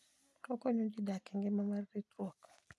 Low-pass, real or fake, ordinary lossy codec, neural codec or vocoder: none; real; none; none